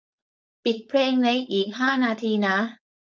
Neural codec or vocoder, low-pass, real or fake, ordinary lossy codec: codec, 16 kHz, 4.8 kbps, FACodec; none; fake; none